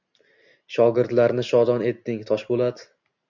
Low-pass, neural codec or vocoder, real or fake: 7.2 kHz; none; real